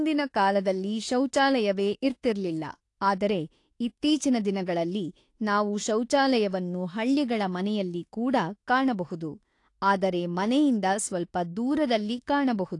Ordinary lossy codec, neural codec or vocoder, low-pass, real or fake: AAC, 48 kbps; autoencoder, 48 kHz, 32 numbers a frame, DAC-VAE, trained on Japanese speech; 10.8 kHz; fake